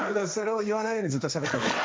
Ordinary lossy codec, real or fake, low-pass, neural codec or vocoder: none; fake; none; codec, 16 kHz, 1.1 kbps, Voila-Tokenizer